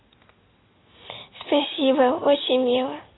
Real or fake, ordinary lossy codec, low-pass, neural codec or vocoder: real; AAC, 16 kbps; 7.2 kHz; none